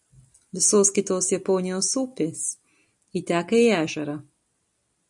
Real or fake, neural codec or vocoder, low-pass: real; none; 10.8 kHz